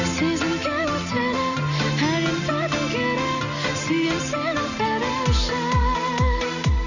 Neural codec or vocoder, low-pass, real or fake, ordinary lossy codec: none; 7.2 kHz; real; none